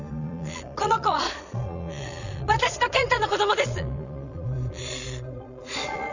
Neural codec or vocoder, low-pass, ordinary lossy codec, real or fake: vocoder, 22.05 kHz, 80 mel bands, Vocos; 7.2 kHz; none; fake